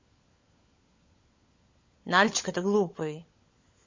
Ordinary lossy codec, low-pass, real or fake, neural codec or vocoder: MP3, 32 kbps; 7.2 kHz; fake; codec, 16 kHz, 16 kbps, FunCodec, trained on LibriTTS, 50 frames a second